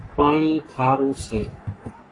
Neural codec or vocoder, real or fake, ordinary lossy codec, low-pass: codec, 44.1 kHz, 3.4 kbps, Pupu-Codec; fake; AAC, 32 kbps; 10.8 kHz